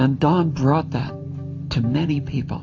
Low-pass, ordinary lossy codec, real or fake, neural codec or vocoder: 7.2 kHz; AAC, 48 kbps; fake; vocoder, 44.1 kHz, 128 mel bands every 512 samples, BigVGAN v2